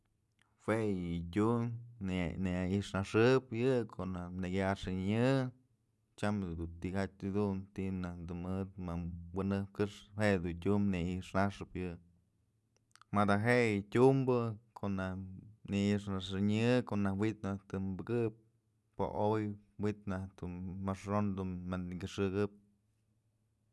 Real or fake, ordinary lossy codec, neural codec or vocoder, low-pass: real; none; none; none